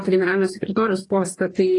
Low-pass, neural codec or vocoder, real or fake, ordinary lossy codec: 10.8 kHz; codec, 44.1 kHz, 2.6 kbps, DAC; fake; AAC, 32 kbps